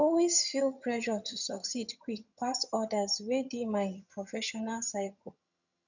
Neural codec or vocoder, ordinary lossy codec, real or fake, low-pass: vocoder, 22.05 kHz, 80 mel bands, HiFi-GAN; none; fake; 7.2 kHz